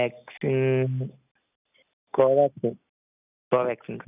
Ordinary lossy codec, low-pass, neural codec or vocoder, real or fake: none; 3.6 kHz; none; real